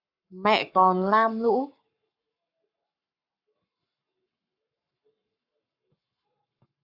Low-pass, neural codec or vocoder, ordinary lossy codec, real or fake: 5.4 kHz; codec, 44.1 kHz, 7.8 kbps, Pupu-Codec; AAC, 48 kbps; fake